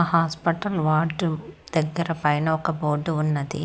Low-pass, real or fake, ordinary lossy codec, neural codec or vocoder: none; real; none; none